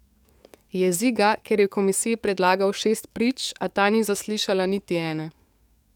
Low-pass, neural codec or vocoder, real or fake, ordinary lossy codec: 19.8 kHz; codec, 44.1 kHz, 7.8 kbps, DAC; fake; none